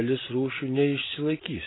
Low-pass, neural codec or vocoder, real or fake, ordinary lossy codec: 7.2 kHz; none; real; AAC, 16 kbps